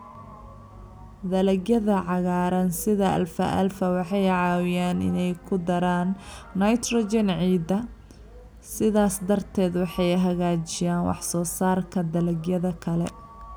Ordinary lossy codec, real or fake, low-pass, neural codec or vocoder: none; real; none; none